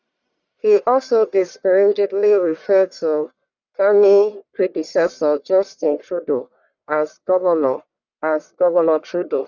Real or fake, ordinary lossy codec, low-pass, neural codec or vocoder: fake; none; 7.2 kHz; codec, 44.1 kHz, 1.7 kbps, Pupu-Codec